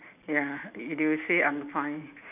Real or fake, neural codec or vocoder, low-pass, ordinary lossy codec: real; none; 3.6 kHz; none